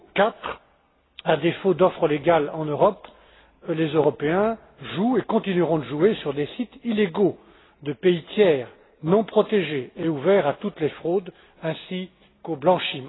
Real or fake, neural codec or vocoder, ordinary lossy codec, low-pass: real; none; AAC, 16 kbps; 7.2 kHz